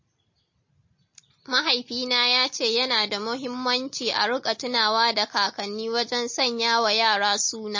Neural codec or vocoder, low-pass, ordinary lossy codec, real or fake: none; 7.2 kHz; MP3, 32 kbps; real